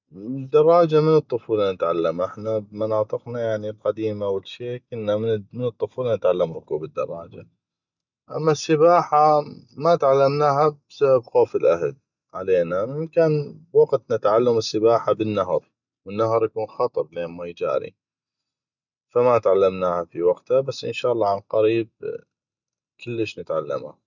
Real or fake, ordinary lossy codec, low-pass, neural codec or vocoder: real; none; 7.2 kHz; none